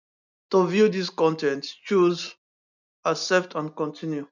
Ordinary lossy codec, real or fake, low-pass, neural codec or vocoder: none; real; 7.2 kHz; none